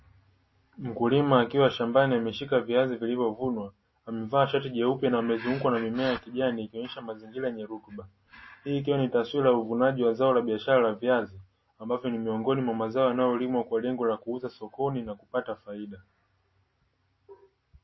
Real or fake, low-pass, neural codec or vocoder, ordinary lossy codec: real; 7.2 kHz; none; MP3, 24 kbps